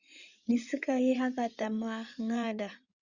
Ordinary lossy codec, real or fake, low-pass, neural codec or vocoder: Opus, 64 kbps; fake; 7.2 kHz; codec, 16 kHz in and 24 kHz out, 2.2 kbps, FireRedTTS-2 codec